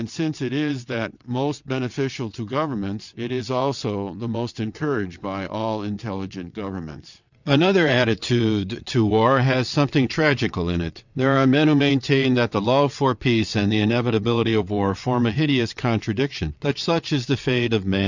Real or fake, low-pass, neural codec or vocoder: fake; 7.2 kHz; vocoder, 22.05 kHz, 80 mel bands, WaveNeXt